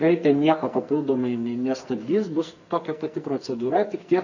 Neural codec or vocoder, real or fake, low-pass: codec, 44.1 kHz, 2.6 kbps, SNAC; fake; 7.2 kHz